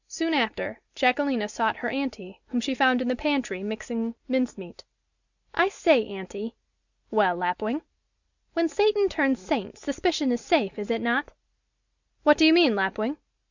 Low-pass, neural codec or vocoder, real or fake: 7.2 kHz; none; real